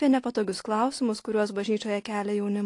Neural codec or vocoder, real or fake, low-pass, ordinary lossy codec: none; real; 10.8 kHz; AAC, 48 kbps